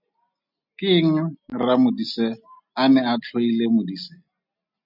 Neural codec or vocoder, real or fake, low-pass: none; real; 5.4 kHz